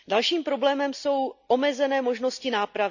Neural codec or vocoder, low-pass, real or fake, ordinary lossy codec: none; 7.2 kHz; real; none